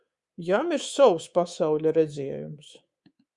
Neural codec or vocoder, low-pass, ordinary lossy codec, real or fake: codec, 24 kHz, 3.1 kbps, DualCodec; 10.8 kHz; Opus, 64 kbps; fake